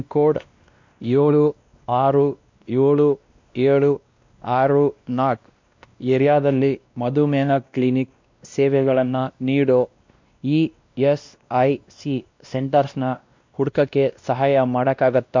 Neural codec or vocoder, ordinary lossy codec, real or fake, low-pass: codec, 16 kHz, 1 kbps, X-Codec, WavLM features, trained on Multilingual LibriSpeech; MP3, 64 kbps; fake; 7.2 kHz